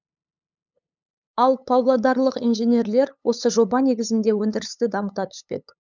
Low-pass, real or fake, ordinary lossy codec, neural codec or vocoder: 7.2 kHz; fake; none; codec, 16 kHz, 8 kbps, FunCodec, trained on LibriTTS, 25 frames a second